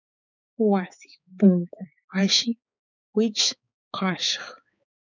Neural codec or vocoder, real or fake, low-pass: codec, 16 kHz, 4 kbps, X-Codec, HuBERT features, trained on balanced general audio; fake; 7.2 kHz